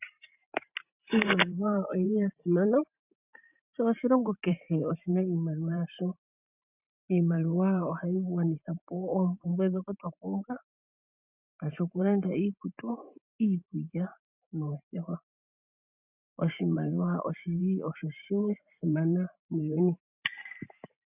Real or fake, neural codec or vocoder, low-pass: fake; vocoder, 44.1 kHz, 128 mel bands every 512 samples, BigVGAN v2; 3.6 kHz